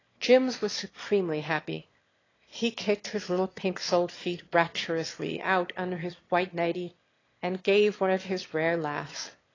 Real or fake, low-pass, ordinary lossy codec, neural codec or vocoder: fake; 7.2 kHz; AAC, 32 kbps; autoencoder, 22.05 kHz, a latent of 192 numbers a frame, VITS, trained on one speaker